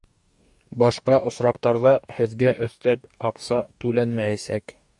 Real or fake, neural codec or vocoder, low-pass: fake; codec, 44.1 kHz, 2.6 kbps, DAC; 10.8 kHz